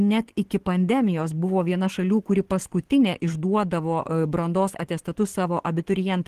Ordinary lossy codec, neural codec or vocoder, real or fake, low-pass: Opus, 16 kbps; codec, 44.1 kHz, 7.8 kbps, DAC; fake; 14.4 kHz